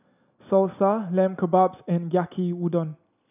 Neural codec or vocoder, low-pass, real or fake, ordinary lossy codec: none; 3.6 kHz; real; none